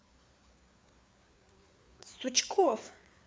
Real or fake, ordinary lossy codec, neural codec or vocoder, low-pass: fake; none; codec, 16 kHz, 4 kbps, FreqCodec, larger model; none